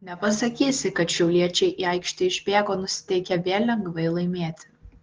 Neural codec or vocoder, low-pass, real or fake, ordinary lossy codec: none; 7.2 kHz; real; Opus, 16 kbps